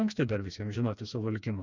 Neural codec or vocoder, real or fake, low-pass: codec, 16 kHz, 2 kbps, FreqCodec, smaller model; fake; 7.2 kHz